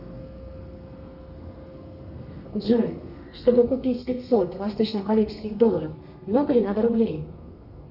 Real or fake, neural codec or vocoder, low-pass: fake; codec, 32 kHz, 1.9 kbps, SNAC; 5.4 kHz